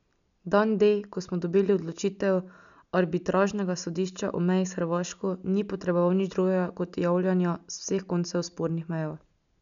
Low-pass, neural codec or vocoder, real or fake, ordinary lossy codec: 7.2 kHz; none; real; none